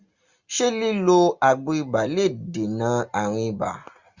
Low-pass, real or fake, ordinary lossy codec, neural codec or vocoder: 7.2 kHz; real; Opus, 64 kbps; none